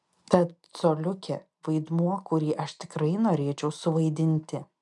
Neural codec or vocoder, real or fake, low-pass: none; real; 10.8 kHz